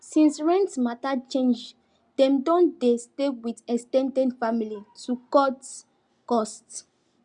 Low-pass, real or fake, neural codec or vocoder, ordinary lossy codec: 9.9 kHz; real; none; Opus, 64 kbps